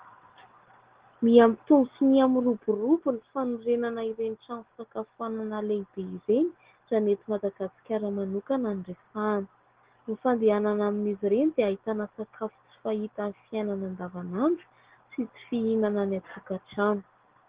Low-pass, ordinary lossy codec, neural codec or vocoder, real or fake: 3.6 kHz; Opus, 16 kbps; none; real